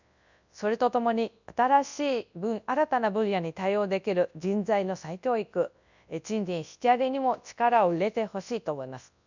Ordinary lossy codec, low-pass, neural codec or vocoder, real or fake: none; 7.2 kHz; codec, 24 kHz, 0.9 kbps, WavTokenizer, large speech release; fake